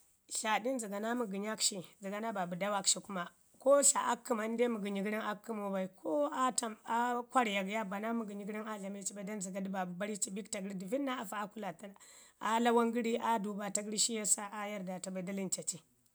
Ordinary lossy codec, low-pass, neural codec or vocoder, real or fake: none; none; none; real